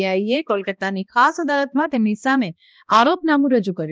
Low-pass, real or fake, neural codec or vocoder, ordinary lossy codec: none; fake; codec, 16 kHz, 1 kbps, X-Codec, HuBERT features, trained on balanced general audio; none